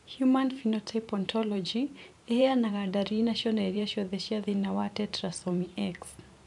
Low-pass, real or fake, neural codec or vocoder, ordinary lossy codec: 10.8 kHz; fake; vocoder, 44.1 kHz, 128 mel bands every 512 samples, BigVGAN v2; none